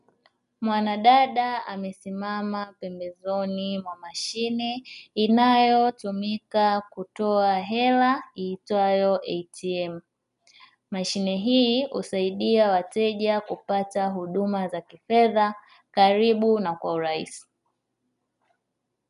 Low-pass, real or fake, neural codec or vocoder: 10.8 kHz; real; none